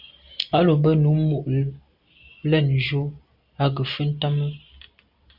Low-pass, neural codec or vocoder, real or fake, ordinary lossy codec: 5.4 kHz; none; real; Opus, 64 kbps